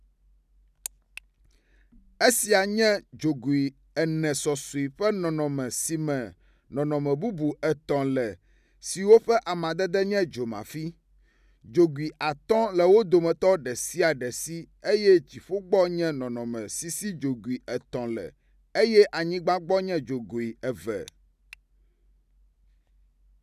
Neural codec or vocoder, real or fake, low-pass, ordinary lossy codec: none; real; 14.4 kHz; none